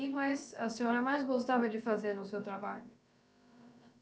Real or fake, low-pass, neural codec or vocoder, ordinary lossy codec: fake; none; codec, 16 kHz, about 1 kbps, DyCAST, with the encoder's durations; none